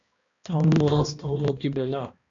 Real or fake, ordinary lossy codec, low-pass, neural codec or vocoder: fake; AAC, 48 kbps; 7.2 kHz; codec, 16 kHz, 1 kbps, X-Codec, HuBERT features, trained on balanced general audio